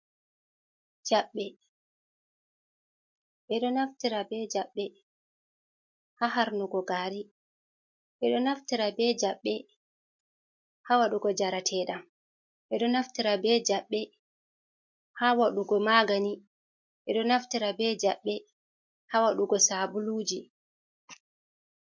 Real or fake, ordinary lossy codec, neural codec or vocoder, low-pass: real; MP3, 48 kbps; none; 7.2 kHz